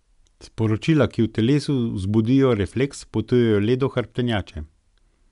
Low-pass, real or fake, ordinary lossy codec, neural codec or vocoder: 10.8 kHz; real; none; none